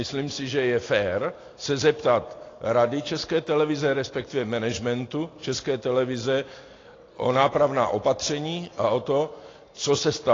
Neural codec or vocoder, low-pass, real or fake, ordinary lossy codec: none; 7.2 kHz; real; AAC, 32 kbps